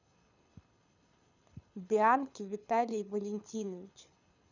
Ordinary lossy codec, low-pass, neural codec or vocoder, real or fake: none; 7.2 kHz; codec, 24 kHz, 6 kbps, HILCodec; fake